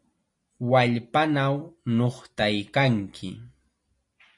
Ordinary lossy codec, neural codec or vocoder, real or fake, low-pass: MP3, 48 kbps; none; real; 10.8 kHz